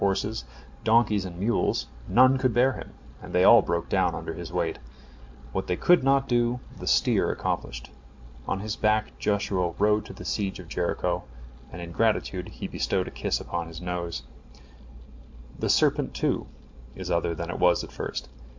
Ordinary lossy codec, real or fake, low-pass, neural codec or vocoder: MP3, 64 kbps; real; 7.2 kHz; none